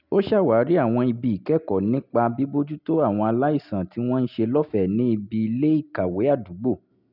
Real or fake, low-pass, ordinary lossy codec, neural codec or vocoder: real; 5.4 kHz; none; none